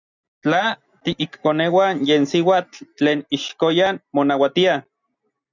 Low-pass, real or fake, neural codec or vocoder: 7.2 kHz; real; none